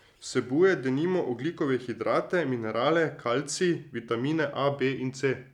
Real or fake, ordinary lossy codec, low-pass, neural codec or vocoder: real; none; 19.8 kHz; none